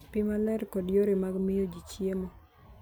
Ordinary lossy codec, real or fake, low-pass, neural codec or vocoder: none; real; none; none